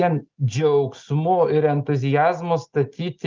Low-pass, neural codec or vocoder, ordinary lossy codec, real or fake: 7.2 kHz; none; Opus, 32 kbps; real